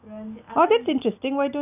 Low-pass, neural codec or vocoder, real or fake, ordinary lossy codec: 3.6 kHz; none; real; none